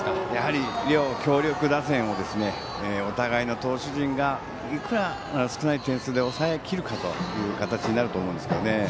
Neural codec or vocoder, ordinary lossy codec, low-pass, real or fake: none; none; none; real